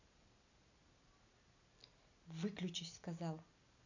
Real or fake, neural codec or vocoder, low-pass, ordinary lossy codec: real; none; 7.2 kHz; none